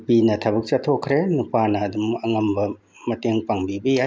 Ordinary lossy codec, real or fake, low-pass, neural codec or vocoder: none; real; none; none